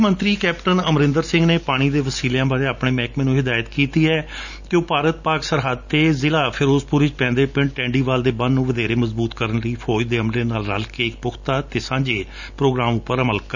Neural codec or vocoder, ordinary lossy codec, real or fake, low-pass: none; none; real; 7.2 kHz